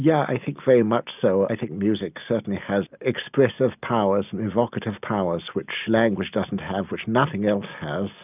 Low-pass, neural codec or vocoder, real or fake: 3.6 kHz; none; real